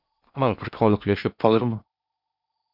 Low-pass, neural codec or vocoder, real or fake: 5.4 kHz; codec, 16 kHz in and 24 kHz out, 0.8 kbps, FocalCodec, streaming, 65536 codes; fake